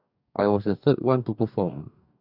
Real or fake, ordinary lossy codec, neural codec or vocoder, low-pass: fake; none; codec, 44.1 kHz, 2.6 kbps, DAC; 5.4 kHz